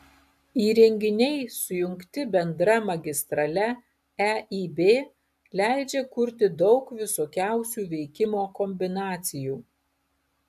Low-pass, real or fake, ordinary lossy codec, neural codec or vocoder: 14.4 kHz; real; AAC, 96 kbps; none